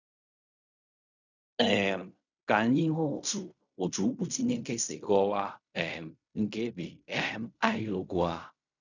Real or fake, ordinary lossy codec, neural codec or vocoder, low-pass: fake; none; codec, 16 kHz in and 24 kHz out, 0.4 kbps, LongCat-Audio-Codec, fine tuned four codebook decoder; 7.2 kHz